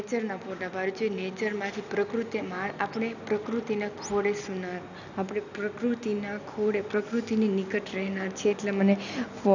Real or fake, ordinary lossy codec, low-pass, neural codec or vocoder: real; none; 7.2 kHz; none